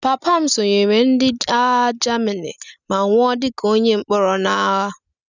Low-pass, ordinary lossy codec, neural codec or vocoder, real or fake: 7.2 kHz; none; none; real